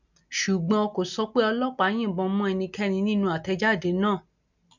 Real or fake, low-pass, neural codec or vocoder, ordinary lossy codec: real; 7.2 kHz; none; none